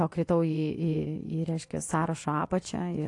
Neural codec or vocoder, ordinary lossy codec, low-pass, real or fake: vocoder, 24 kHz, 100 mel bands, Vocos; AAC, 48 kbps; 10.8 kHz; fake